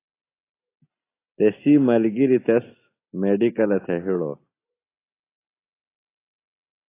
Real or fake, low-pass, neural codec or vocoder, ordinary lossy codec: real; 3.6 kHz; none; AAC, 24 kbps